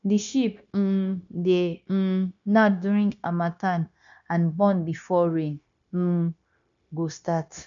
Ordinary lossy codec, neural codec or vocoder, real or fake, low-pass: none; codec, 16 kHz, 0.9 kbps, LongCat-Audio-Codec; fake; 7.2 kHz